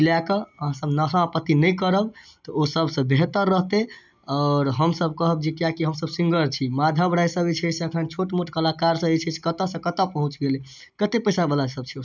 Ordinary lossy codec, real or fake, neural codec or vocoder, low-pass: none; real; none; 7.2 kHz